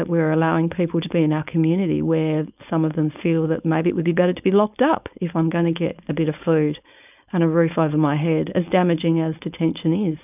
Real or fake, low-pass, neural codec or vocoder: fake; 3.6 kHz; codec, 16 kHz, 4.8 kbps, FACodec